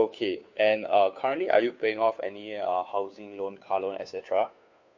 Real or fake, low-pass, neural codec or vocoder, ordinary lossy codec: fake; 7.2 kHz; codec, 16 kHz, 2 kbps, FunCodec, trained on Chinese and English, 25 frames a second; MP3, 48 kbps